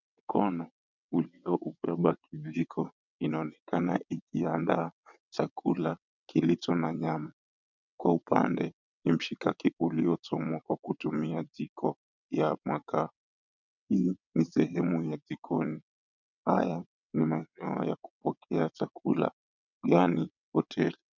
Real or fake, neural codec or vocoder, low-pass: fake; vocoder, 22.05 kHz, 80 mel bands, WaveNeXt; 7.2 kHz